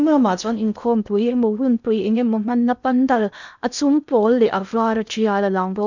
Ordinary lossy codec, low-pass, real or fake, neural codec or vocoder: none; 7.2 kHz; fake; codec, 16 kHz in and 24 kHz out, 0.6 kbps, FocalCodec, streaming, 2048 codes